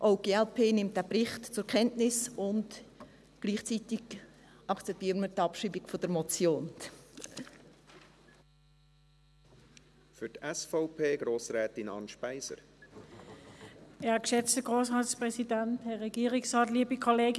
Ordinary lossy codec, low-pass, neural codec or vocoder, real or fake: none; none; none; real